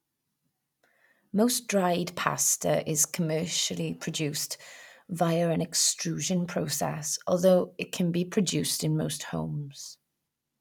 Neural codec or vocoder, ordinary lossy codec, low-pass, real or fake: none; none; 19.8 kHz; real